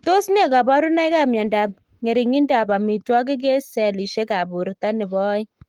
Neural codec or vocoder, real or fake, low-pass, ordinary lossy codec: codec, 44.1 kHz, 7.8 kbps, Pupu-Codec; fake; 19.8 kHz; Opus, 24 kbps